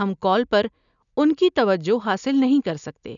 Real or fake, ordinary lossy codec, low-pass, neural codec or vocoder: real; none; 7.2 kHz; none